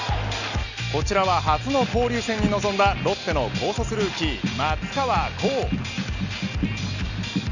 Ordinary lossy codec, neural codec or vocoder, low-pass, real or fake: none; none; 7.2 kHz; real